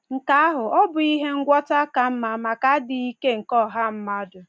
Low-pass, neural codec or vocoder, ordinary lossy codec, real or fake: none; none; none; real